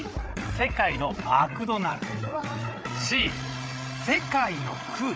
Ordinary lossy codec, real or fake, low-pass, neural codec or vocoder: none; fake; none; codec, 16 kHz, 8 kbps, FreqCodec, larger model